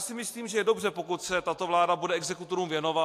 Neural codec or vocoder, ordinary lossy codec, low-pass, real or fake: none; AAC, 64 kbps; 14.4 kHz; real